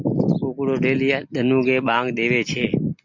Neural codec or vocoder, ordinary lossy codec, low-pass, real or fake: none; MP3, 48 kbps; 7.2 kHz; real